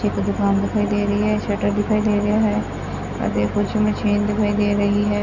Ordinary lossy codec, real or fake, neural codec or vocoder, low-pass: none; real; none; 7.2 kHz